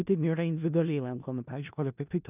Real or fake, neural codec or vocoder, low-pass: fake; codec, 16 kHz in and 24 kHz out, 0.4 kbps, LongCat-Audio-Codec, four codebook decoder; 3.6 kHz